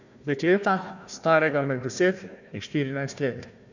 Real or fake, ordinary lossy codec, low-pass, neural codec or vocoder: fake; none; 7.2 kHz; codec, 16 kHz, 1 kbps, FunCodec, trained on Chinese and English, 50 frames a second